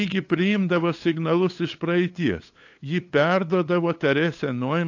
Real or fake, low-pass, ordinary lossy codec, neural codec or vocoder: fake; 7.2 kHz; AAC, 48 kbps; codec, 16 kHz, 8 kbps, FunCodec, trained on LibriTTS, 25 frames a second